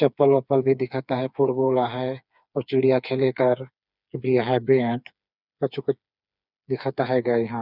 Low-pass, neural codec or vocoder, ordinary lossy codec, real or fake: 5.4 kHz; codec, 16 kHz, 4 kbps, FreqCodec, smaller model; none; fake